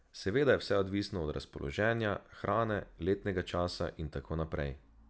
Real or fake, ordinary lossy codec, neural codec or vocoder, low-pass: real; none; none; none